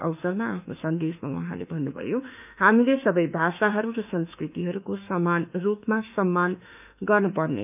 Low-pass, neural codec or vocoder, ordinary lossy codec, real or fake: 3.6 kHz; autoencoder, 48 kHz, 32 numbers a frame, DAC-VAE, trained on Japanese speech; none; fake